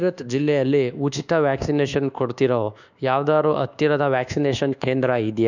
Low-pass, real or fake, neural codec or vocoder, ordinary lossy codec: 7.2 kHz; fake; codec, 24 kHz, 1.2 kbps, DualCodec; none